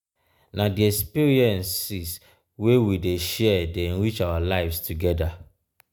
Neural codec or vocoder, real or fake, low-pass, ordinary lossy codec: none; real; none; none